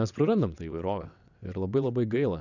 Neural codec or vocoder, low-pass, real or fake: vocoder, 22.05 kHz, 80 mel bands, WaveNeXt; 7.2 kHz; fake